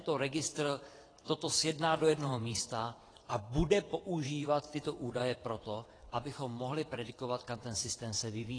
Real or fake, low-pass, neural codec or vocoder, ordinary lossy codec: fake; 9.9 kHz; vocoder, 22.05 kHz, 80 mel bands, WaveNeXt; AAC, 32 kbps